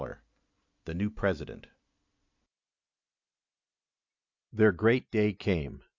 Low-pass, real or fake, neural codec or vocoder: 7.2 kHz; real; none